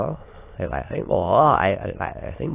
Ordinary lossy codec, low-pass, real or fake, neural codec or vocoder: AAC, 24 kbps; 3.6 kHz; fake; autoencoder, 22.05 kHz, a latent of 192 numbers a frame, VITS, trained on many speakers